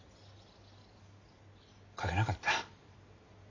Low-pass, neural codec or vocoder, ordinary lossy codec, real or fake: 7.2 kHz; none; AAC, 32 kbps; real